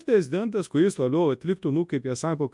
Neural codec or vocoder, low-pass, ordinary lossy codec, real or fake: codec, 24 kHz, 0.9 kbps, WavTokenizer, large speech release; 10.8 kHz; MP3, 64 kbps; fake